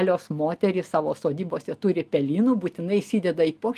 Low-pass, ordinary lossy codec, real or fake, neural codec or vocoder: 14.4 kHz; Opus, 32 kbps; real; none